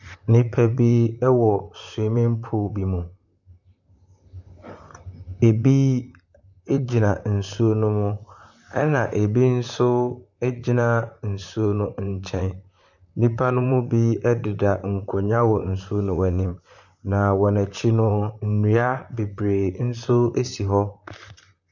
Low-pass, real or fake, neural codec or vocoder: 7.2 kHz; fake; vocoder, 44.1 kHz, 128 mel bands, Pupu-Vocoder